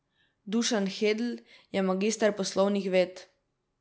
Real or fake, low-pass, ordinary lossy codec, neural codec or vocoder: real; none; none; none